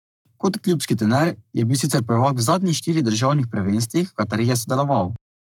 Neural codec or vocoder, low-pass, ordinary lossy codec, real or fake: codec, 44.1 kHz, 7.8 kbps, Pupu-Codec; 19.8 kHz; none; fake